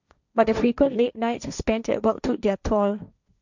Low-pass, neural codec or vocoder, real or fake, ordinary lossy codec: 7.2 kHz; codec, 16 kHz, 1.1 kbps, Voila-Tokenizer; fake; none